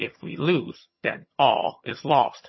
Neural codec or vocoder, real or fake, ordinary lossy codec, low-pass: vocoder, 22.05 kHz, 80 mel bands, HiFi-GAN; fake; MP3, 24 kbps; 7.2 kHz